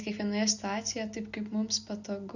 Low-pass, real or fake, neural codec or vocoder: 7.2 kHz; real; none